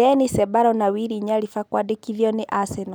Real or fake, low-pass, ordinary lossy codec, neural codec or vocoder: real; none; none; none